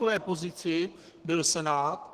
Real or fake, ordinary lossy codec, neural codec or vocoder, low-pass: fake; Opus, 16 kbps; codec, 32 kHz, 1.9 kbps, SNAC; 14.4 kHz